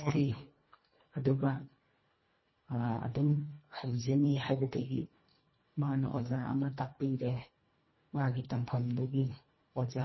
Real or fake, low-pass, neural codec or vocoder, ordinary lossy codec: fake; 7.2 kHz; codec, 24 kHz, 1.5 kbps, HILCodec; MP3, 24 kbps